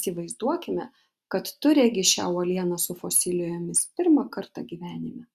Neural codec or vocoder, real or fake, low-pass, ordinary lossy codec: none; real; 14.4 kHz; Opus, 64 kbps